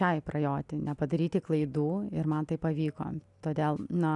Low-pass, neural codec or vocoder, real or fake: 10.8 kHz; none; real